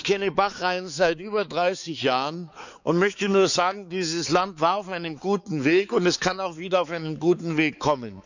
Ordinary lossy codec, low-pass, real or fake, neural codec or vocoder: none; 7.2 kHz; fake; codec, 16 kHz, 4 kbps, X-Codec, HuBERT features, trained on balanced general audio